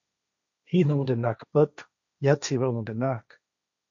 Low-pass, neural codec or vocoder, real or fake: 7.2 kHz; codec, 16 kHz, 1.1 kbps, Voila-Tokenizer; fake